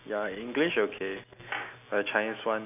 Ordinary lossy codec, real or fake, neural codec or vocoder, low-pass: none; real; none; 3.6 kHz